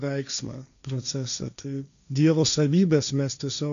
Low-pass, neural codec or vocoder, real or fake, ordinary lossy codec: 7.2 kHz; codec, 16 kHz, 1.1 kbps, Voila-Tokenizer; fake; MP3, 96 kbps